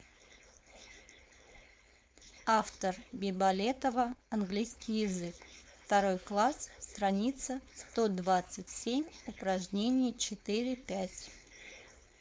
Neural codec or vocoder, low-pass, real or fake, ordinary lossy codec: codec, 16 kHz, 4.8 kbps, FACodec; none; fake; none